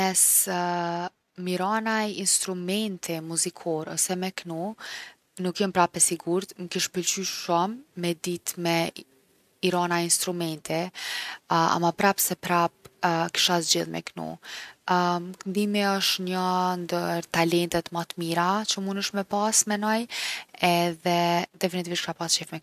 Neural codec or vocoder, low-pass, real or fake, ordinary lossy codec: none; 14.4 kHz; real; none